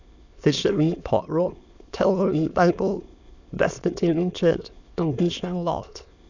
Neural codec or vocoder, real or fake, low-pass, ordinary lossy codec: autoencoder, 22.05 kHz, a latent of 192 numbers a frame, VITS, trained on many speakers; fake; 7.2 kHz; none